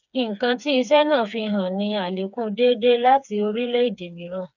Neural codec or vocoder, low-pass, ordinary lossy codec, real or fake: codec, 16 kHz, 4 kbps, FreqCodec, smaller model; 7.2 kHz; none; fake